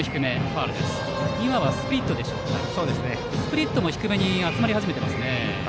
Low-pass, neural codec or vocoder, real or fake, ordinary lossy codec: none; none; real; none